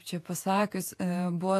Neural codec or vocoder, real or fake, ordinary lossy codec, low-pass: vocoder, 48 kHz, 128 mel bands, Vocos; fake; AAC, 64 kbps; 14.4 kHz